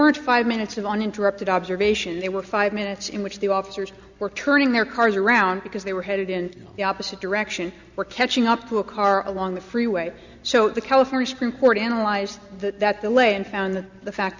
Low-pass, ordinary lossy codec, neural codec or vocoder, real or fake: 7.2 kHz; Opus, 64 kbps; none; real